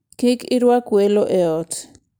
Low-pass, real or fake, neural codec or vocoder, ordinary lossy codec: none; real; none; none